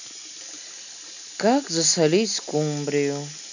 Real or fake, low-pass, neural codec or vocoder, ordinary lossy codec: real; 7.2 kHz; none; none